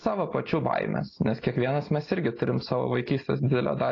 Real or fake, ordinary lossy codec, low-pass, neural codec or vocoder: real; AAC, 32 kbps; 7.2 kHz; none